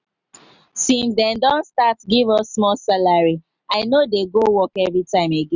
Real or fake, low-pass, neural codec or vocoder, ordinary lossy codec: real; 7.2 kHz; none; none